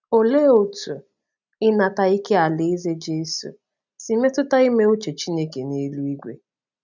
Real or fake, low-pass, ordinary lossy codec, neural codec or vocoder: real; 7.2 kHz; none; none